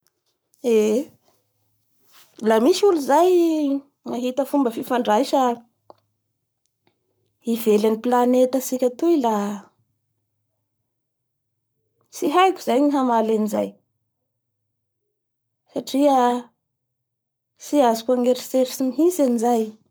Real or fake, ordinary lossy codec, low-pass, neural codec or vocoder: fake; none; none; codec, 44.1 kHz, 7.8 kbps, Pupu-Codec